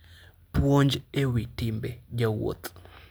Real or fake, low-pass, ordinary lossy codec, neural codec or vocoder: real; none; none; none